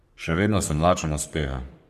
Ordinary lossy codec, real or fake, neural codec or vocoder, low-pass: none; fake; codec, 44.1 kHz, 3.4 kbps, Pupu-Codec; 14.4 kHz